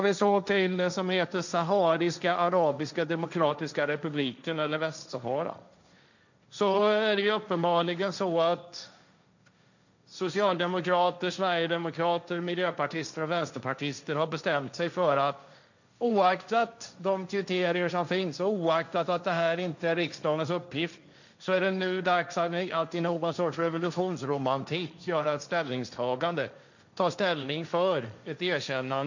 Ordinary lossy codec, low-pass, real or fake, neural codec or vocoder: none; 7.2 kHz; fake; codec, 16 kHz, 1.1 kbps, Voila-Tokenizer